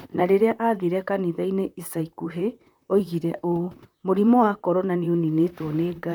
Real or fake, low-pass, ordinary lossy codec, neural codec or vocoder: fake; 19.8 kHz; none; vocoder, 44.1 kHz, 128 mel bands, Pupu-Vocoder